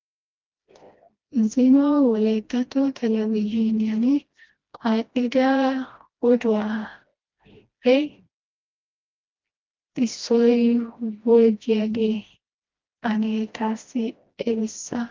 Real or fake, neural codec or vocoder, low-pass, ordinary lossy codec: fake; codec, 16 kHz, 1 kbps, FreqCodec, smaller model; 7.2 kHz; Opus, 32 kbps